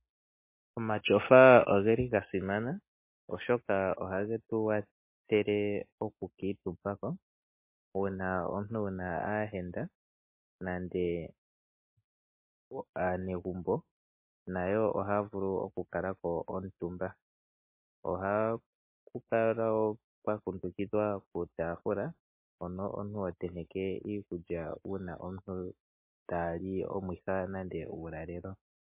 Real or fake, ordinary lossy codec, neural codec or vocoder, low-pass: real; MP3, 24 kbps; none; 3.6 kHz